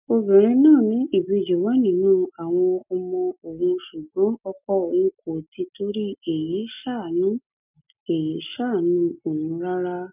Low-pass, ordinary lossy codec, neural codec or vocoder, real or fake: 3.6 kHz; none; none; real